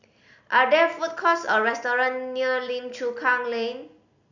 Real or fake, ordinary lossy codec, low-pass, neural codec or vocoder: real; none; 7.2 kHz; none